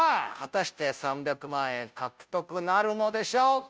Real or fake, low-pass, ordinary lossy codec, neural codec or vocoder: fake; none; none; codec, 16 kHz, 0.5 kbps, FunCodec, trained on Chinese and English, 25 frames a second